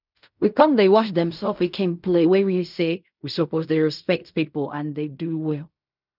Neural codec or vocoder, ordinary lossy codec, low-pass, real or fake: codec, 16 kHz in and 24 kHz out, 0.4 kbps, LongCat-Audio-Codec, fine tuned four codebook decoder; none; 5.4 kHz; fake